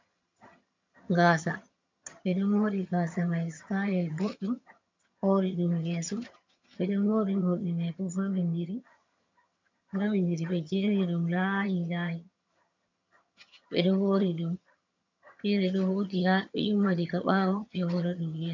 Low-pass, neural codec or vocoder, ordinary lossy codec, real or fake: 7.2 kHz; vocoder, 22.05 kHz, 80 mel bands, HiFi-GAN; MP3, 64 kbps; fake